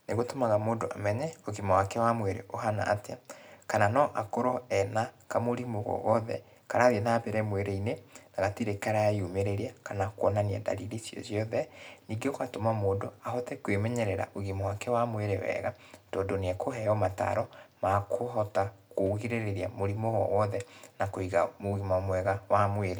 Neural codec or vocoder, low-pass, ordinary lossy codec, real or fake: none; none; none; real